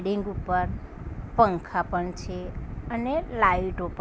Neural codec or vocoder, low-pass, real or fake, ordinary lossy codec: none; none; real; none